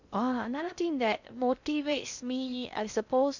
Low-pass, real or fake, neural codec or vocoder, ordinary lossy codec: 7.2 kHz; fake; codec, 16 kHz in and 24 kHz out, 0.6 kbps, FocalCodec, streaming, 2048 codes; none